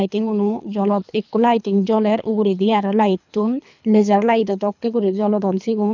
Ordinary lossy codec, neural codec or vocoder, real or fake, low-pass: none; codec, 24 kHz, 3 kbps, HILCodec; fake; 7.2 kHz